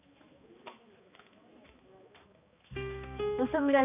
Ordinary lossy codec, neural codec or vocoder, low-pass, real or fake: none; codec, 16 kHz, 2 kbps, X-Codec, HuBERT features, trained on balanced general audio; 3.6 kHz; fake